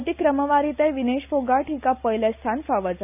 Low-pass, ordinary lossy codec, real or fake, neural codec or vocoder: 3.6 kHz; none; real; none